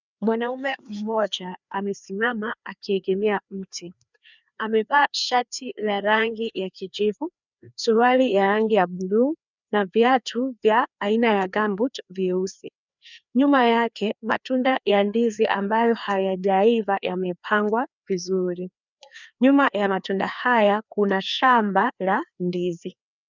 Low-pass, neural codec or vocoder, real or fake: 7.2 kHz; codec, 16 kHz, 2 kbps, FreqCodec, larger model; fake